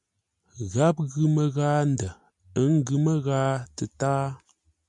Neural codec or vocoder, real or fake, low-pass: none; real; 10.8 kHz